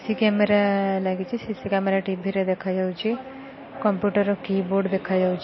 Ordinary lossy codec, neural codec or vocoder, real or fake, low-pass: MP3, 24 kbps; none; real; 7.2 kHz